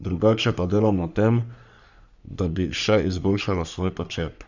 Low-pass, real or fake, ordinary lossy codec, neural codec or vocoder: 7.2 kHz; fake; none; codec, 44.1 kHz, 3.4 kbps, Pupu-Codec